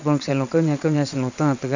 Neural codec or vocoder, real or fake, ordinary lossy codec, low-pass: vocoder, 22.05 kHz, 80 mel bands, WaveNeXt; fake; none; 7.2 kHz